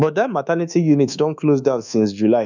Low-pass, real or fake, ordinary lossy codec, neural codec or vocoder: 7.2 kHz; fake; none; codec, 24 kHz, 1.2 kbps, DualCodec